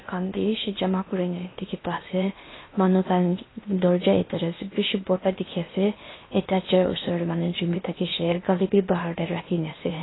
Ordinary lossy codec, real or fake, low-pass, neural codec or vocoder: AAC, 16 kbps; fake; 7.2 kHz; codec, 16 kHz in and 24 kHz out, 0.8 kbps, FocalCodec, streaming, 65536 codes